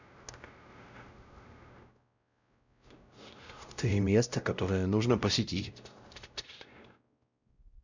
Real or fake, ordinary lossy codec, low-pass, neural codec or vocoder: fake; none; 7.2 kHz; codec, 16 kHz, 0.5 kbps, X-Codec, WavLM features, trained on Multilingual LibriSpeech